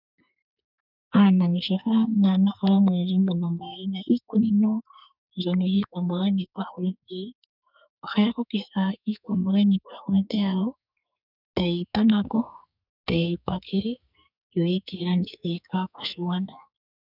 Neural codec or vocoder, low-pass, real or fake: codec, 44.1 kHz, 2.6 kbps, SNAC; 5.4 kHz; fake